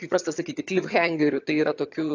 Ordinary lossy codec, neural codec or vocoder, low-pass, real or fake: AAC, 48 kbps; vocoder, 22.05 kHz, 80 mel bands, HiFi-GAN; 7.2 kHz; fake